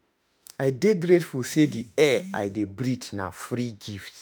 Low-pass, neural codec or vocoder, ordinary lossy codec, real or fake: none; autoencoder, 48 kHz, 32 numbers a frame, DAC-VAE, trained on Japanese speech; none; fake